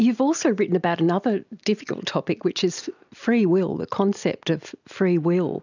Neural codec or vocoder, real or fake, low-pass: none; real; 7.2 kHz